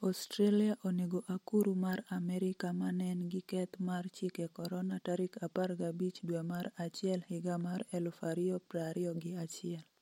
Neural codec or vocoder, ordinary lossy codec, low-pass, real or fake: none; MP3, 64 kbps; 19.8 kHz; real